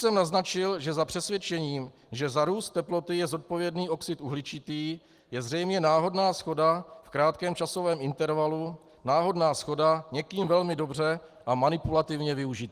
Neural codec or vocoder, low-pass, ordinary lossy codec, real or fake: none; 14.4 kHz; Opus, 24 kbps; real